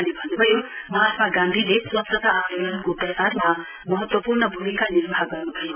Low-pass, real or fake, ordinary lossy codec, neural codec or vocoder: 3.6 kHz; real; none; none